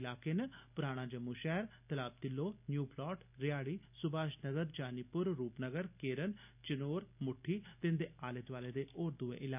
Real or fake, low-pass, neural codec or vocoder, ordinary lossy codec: real; 3.6 kHz; none; none